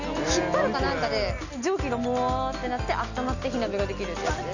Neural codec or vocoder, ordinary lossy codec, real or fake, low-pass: none; none; real; 7.2 kHz